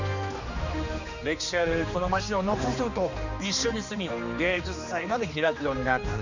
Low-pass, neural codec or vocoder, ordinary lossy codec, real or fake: 7.2 kHz; codec, 16 kHz, 2 kbps, X-Codec, HuBERT features, trained on general audio; none; fake